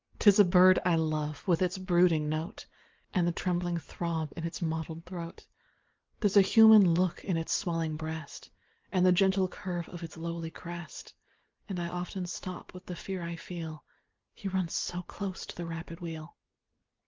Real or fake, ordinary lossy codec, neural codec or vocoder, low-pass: real; Opus, 32 kbps; none; 7.2 kHz